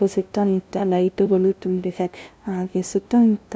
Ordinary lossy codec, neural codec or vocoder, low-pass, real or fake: none; codec, 16 kHz, 0.5 kbps, FunCodec, trained on LibriTTS, 25 frames a second; none; fake